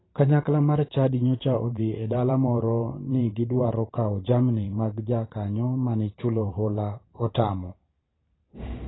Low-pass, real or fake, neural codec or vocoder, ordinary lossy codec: 7.2 kHz; fake; vocoder, 44.1 kHz, 128 mel bands every 512 samples, BigVGAN v2; AAC, 16 kbps